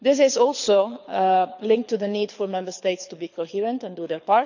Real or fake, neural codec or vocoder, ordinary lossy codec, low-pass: fake; codec, 24 kHz, 6 kbps, HILCodec; none; 7.2 kHz